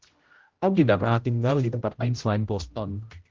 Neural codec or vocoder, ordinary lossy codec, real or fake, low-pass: codec, 16 kHz, 0.5 kbps, X-Codec, HuBERT features, trained on general audio; Opus, 16 kbps; fake; 7.2 kHz